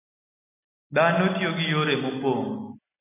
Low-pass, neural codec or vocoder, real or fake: 3.6 kHz; none; real